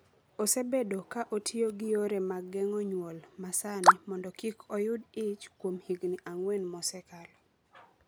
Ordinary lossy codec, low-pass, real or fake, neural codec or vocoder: none; none; real; none